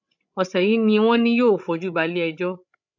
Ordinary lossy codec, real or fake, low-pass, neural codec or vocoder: none; fake; 7.2 kHz; codec, 16 kHz, 16 kbps, FreqCodec, larger model